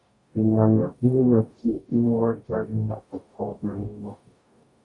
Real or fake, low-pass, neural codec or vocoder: fake; 10.8 kHz; codec, 44.1 kHz, 0.9 kbps, DAC